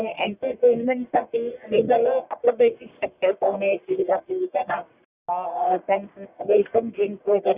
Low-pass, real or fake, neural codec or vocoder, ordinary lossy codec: 3.6 kHz; fake; codec, 44.1 kHz, 1.7 kbps, Pupu-Codec; Opus, 64 kbps